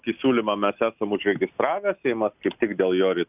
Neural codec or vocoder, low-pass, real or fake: none; 3.6 kHz; real